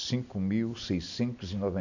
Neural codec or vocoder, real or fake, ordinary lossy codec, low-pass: none; real; none; 7.2 kHz